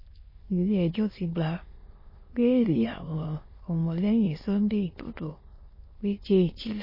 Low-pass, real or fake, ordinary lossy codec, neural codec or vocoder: 5.4 kHz; fake; MP3, 24 kbps; autoencoder, 22.05 kHz, a latent of 192 numbers a frame, VITS, trained on many speakers